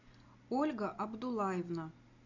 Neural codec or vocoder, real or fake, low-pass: none; real; 7.2 kHz